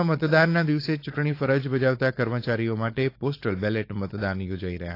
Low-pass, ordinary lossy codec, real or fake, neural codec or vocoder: 5.4 kHz; AAC, 32 kbps; fake; codec, 16 kHz, 4.8 kbps, FACodec